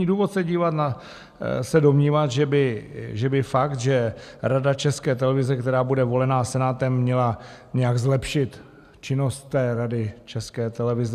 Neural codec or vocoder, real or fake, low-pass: none; real; 14.4 kHz